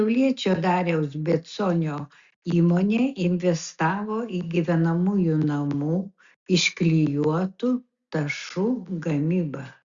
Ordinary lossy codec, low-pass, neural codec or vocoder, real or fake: Opus, 64 kbps; 7.2 kHz; none; real